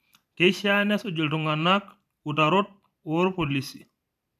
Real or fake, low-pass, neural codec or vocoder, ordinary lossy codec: fake; 14.4 kHz; vocoder, 44.1 kHz, 128 mel bands every 512 samples, BigVGAN v2; none